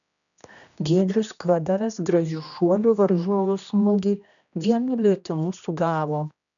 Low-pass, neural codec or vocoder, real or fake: 7.2 kHz; codec, 16 kHz, 1 kbps, X-Codec, HuBERT features, trained on general audio; fake